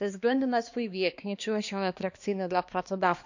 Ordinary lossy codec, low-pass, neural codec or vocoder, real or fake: none; 7.2 kHz; codec, 16 kHz, 2 kbps, X-Codec, HuBERT features, trained on balanced general audio; fake